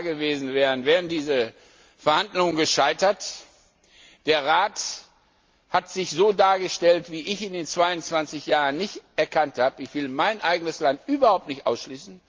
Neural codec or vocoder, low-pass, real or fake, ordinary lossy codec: none; 7.2 kHz; real; Opus, 32 kbps